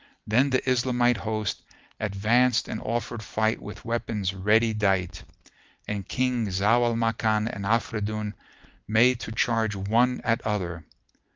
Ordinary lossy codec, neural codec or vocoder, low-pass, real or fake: Opus, 32 kbps; none; 7.2 kHz; real